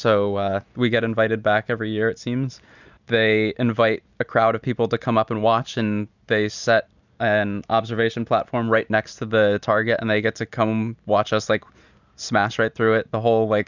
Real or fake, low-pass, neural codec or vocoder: real; 7.2 kHz; none